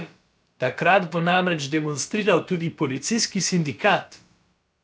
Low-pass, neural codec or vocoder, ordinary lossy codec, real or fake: none; codec, 16 kHz, about 1 kbps, DyCAST, with the encoder's durations; none; fake